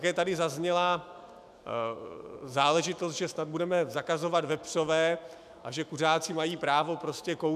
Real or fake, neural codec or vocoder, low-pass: fake; autoencoder, 48 kHz, 128 numbers a frame, DAC-VAE, trained on Japanese speech; 14.4 kHz